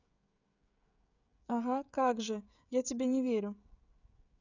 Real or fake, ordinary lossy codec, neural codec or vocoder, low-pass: fake; none; codec, 16 kHz, 16 kbps, FreqCodec, smaller model; 7.2 kHz